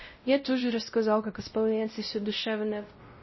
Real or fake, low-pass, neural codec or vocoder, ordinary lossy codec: fake; 7.2 kHz; codec, 16 kHz, 0.5 kbps, X-Codec, WavLM features, trained on Multilingual LibriSpeech; MP3, 24 kbps